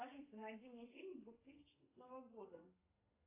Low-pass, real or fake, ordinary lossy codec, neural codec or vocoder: 3.6 kHz; fake; AAC, 24 kbps; codec, 32 kHz, 1.9 kbps, SNAC